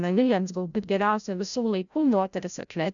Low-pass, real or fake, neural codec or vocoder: 7.2 kHz; fake; codec, 16 kHz, 0.5 kbps, FreqCodec, larger model